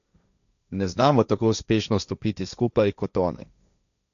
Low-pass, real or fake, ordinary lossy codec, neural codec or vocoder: 7.2 kHz; fake; none; codec, 16 kHz, 1.1 kbps, Voila-Tokenizer